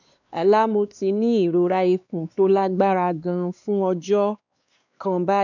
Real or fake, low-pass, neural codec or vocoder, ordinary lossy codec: fake; 7.2 kHz; codec, 16 kHz, 2 kbps, X-Codec, WavLM features, trained on Multilingual LibriSpeech; none